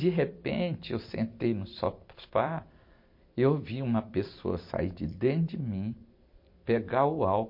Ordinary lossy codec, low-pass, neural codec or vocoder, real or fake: MP3, 32 kbps; 5.4 kHz; vocoder, 44.1 kHz, 128 mel bands every 256 samples, BigVGAN v2; fake